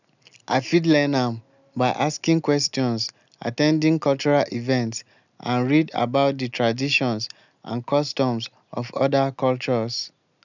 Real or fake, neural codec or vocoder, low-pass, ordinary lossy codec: real; none; 7.2 kHz; none